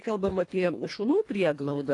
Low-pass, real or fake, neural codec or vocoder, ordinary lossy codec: 10.8 kHz; fake; codec, 24 kHz, 1.5 kbps, HILCodec; AAC, 48 kbps